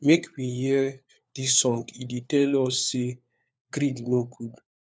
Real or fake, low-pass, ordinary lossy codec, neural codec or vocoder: fake; none; none; codec, 16 kHz, 4 kbps, FunCodec, trained on LibriTTS, 50 frames a second